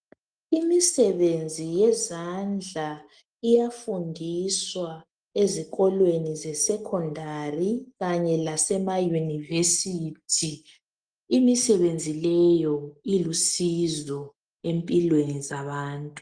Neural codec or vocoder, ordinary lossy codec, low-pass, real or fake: none; Opus, 24 kbps; 9.9 kHz; real